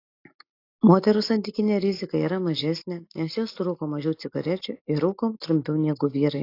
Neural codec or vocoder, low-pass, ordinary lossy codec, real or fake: none; 5.4 kHz; AAC, 32 kbps; real